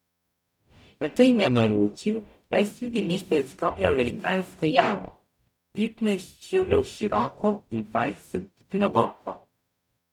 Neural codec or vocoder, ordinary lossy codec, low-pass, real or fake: codec, 44.1 kHz, 0.9 kbps, DAC; none; 19.8 kHz; fake